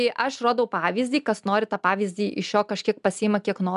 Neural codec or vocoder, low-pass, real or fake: none; 10.8 kHz; real